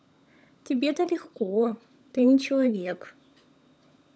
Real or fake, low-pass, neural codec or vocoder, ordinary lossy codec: fake; none; codec, 16 kHz, 16 kbps, FunCodec, trained on LibriTTS, 50 frames a second; none